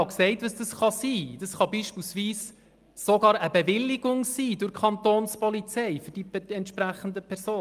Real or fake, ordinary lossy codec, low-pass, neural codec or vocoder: real; Opus, 32 kbps; 14.4 kHz; none